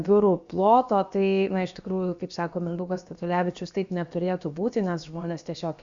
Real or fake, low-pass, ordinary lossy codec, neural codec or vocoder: fake; 7.2 kHz; Opus, 64 kbps; codec, 16 kHz, about 1 kbps, DyCAST, with the encoder's durations